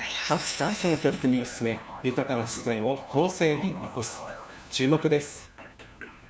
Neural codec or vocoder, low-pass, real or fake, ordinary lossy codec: codec, 16 kHz, 1 kbps, FunCodec, trained on LibriTTS, 50 frames a second; none; fake; none